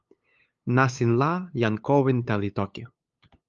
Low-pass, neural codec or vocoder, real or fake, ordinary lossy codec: 7.2 kHz; codec, 16 kHz, 8 kbps, FunCodec, trained on LibriTTS, 25 frames a second; fake; Opus, 32 kbps